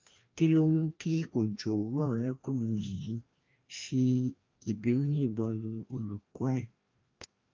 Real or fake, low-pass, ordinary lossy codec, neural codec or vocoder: fake; 7.2 kHz; Opus, 24 kbps; codec, 16 kHz, 1 kbps, FreqCodec, larger model